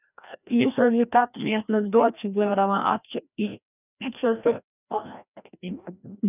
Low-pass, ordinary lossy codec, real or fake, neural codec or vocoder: 3.6 kHz; none; fake; codec, 16 kHz, 1 kbps, FreqCodec, larger model